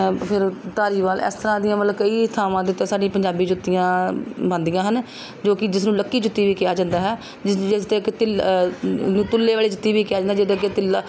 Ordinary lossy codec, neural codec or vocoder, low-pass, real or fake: none; none; none; real